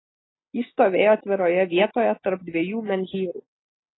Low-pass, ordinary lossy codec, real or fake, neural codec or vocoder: 7.2 kHz; AAC, 16 kbps; real; none